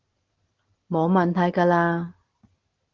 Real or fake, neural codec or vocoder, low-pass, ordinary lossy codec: real; none; 7.2 kHz; Opus, 16 kbps